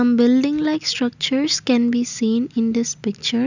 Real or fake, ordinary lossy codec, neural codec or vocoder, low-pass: real; none; none; 7.2 kHz